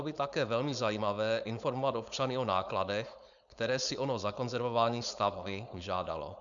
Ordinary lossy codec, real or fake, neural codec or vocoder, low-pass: MP3, 96 kbps; fake; codec, 16 kHz, 4.8 kbps, FACodec; 7.2 kHz